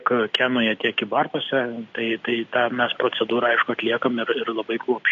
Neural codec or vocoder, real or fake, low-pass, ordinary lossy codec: none; real; 7.2 kHz; AAC, 48 kbps